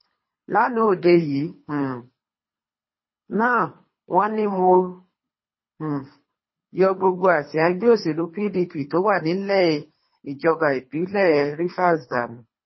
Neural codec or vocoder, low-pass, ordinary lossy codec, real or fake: codec, 24 kHz, 3 kbps, HILCodec; 7.2 kHz; MP3, 24 kbps; fake